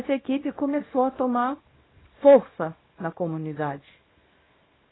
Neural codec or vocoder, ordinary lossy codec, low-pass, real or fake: codec, 24 kHz, 0.9 kbps, WavTokenizer, small release; AAC, 16 kbps; 7.2 kHz; fake